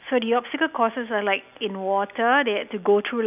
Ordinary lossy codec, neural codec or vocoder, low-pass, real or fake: none; none; 3.6 kHz; real